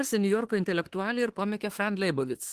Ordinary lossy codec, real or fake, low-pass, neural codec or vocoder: Opus, 16 kbps; fake; 14.4 kHz; autoencoder, 48 kHz, 32 numbers a frame, DAC-VAE, trained on Japanese speech